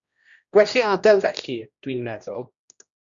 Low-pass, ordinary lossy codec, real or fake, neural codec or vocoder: 7.2 kHz; Opus, 64 kbps; fake; codec, 16 kHz, 1 kbps, X-Codec, HuBERT features, trained on general audio